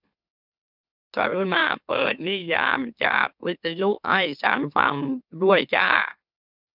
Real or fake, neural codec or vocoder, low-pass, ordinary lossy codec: fake; autoencoder, 44.1 kHz, a latent of 192 numbers a frame, MeloTTS; 5.4 kHz; none